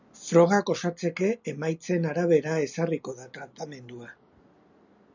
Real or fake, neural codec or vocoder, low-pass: real; none; 7.2 kHz